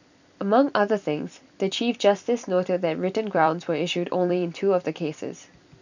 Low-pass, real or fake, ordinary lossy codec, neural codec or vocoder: 7.2 kHz; fake; none; vocoder, 44.1 kHz, 128 mel bands every 256 samples, BigVGAN v2